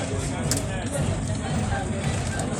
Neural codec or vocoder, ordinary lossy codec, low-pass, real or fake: none; none; 19.8 kHz; real